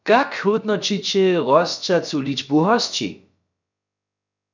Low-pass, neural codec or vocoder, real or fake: 7.2 kHz; codec, 16 kHz, about 1 kbps, DyCAST, with the encoder's durations; fake